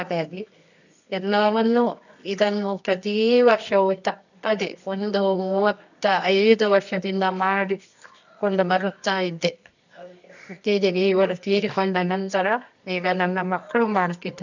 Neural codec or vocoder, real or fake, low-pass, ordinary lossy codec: codec, 24 kHz, 0.9 kbps, WavTokenizer, medium music audio release; fake; 7.2 kHz; none